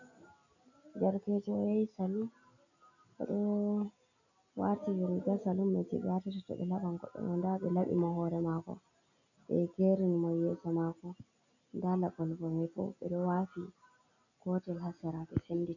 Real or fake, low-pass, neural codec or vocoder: real; 7.2 kHz; none